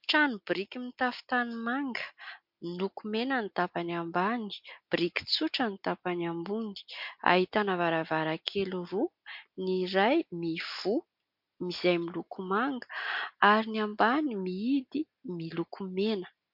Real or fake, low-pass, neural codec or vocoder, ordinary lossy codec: real; 5.4 kHz; none; MP3, 48 kbps